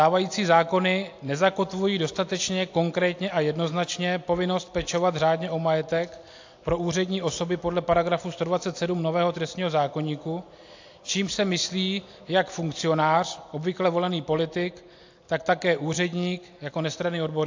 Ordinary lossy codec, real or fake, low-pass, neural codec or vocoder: AAC, 48 kbps; real; 7.2 kHz; none